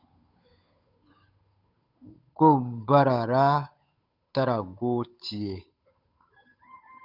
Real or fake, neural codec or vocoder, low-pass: fake; codec, 16 kHz, 8 kbps, FunCodec, trained on Chinese and English, 25 frames a second; 5.4 kHz